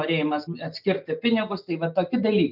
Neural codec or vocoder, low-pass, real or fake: none; 5.4 kHz; real